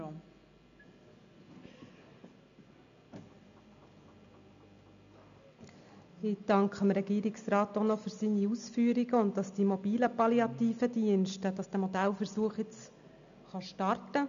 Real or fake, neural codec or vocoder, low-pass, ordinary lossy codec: real; none; 7.2 kHz; none